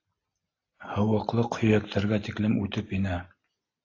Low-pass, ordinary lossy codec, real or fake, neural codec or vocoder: 7.2 kHz; AAC, 32 kbps; real; none